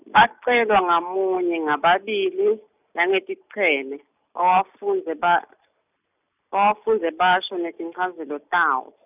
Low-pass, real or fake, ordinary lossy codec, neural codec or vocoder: 3.6 kHz; real; none; none